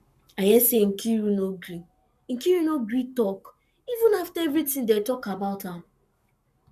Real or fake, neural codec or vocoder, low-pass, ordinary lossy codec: fake; codec, 44.1 kHz, 7.8 kbps, Pupu-Codec; 14.4 kHz; none